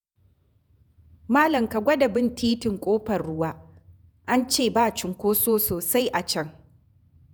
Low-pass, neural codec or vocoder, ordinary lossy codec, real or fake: none; vocoder, 48 kHz, 128 mel bands, Vocos; none; fake